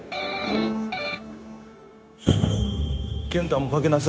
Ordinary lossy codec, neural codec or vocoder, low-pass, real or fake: none; codec, 16 kHz, 0.9 kbps, LongCat-Audio-Codec; none; fake